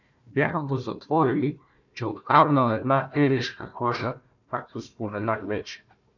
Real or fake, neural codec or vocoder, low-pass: fake; codec, 16 kHz, 1 kbps, FunCodec, trained on Chinese and English, 50 frames a second; 7.2 kHz